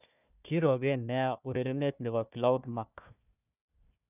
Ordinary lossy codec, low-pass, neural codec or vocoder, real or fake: none; 3.6 kHz; codec, 16 kHz, 1 kbps, FunCodec, trained on Chinese and English, 50 frames a second; fake